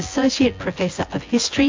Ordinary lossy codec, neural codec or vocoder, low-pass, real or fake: MP3, 48 kbps; vocoder, 24 kHz, 100 mel bands, Vocos; 7.2 kHz; fake